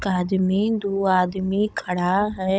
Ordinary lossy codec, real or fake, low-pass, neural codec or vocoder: none; fake; none; codec, 16 kHz, 16 kbps, FunCodec, trained on Chinese and English, 50 frames a second